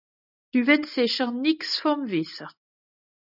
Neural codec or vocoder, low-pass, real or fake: none; 5.4 kHz; real